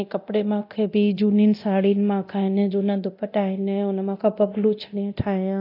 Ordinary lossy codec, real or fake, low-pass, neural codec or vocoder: AAC, 32 kbps; fake; 5.4 kHz; codec, 24 kHz, 0.9 kbps, DualCodec